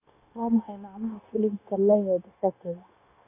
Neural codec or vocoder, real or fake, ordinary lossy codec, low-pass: codec, 16 kHz, 0.9 kbps, LongCat-Audio-Codec; fake; none; 3.6 kHz